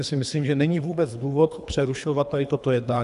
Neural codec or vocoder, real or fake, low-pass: codec, 24 kHz, 3 kbps, HILCodec; fake; 10.8 kHz